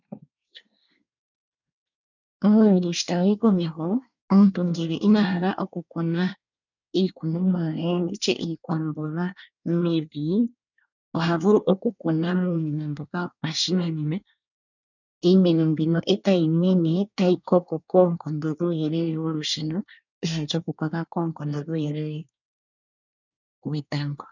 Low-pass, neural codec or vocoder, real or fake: 7.2 kHz; codec, 24 kHz, 1 kbps, SNAC; fake